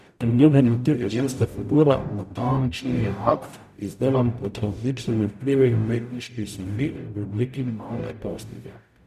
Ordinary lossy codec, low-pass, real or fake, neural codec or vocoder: none; 14.4 kHz; fake; codec, 44.1 kHz, 0.9 kbps, DAC